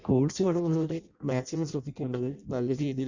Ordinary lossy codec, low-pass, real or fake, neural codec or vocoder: Opus, 64 kbps; 7.2 kHz; fake; codec, 16 kHz in and 24 kHz out, 0.6 kbps, FireRedTTS-2 codec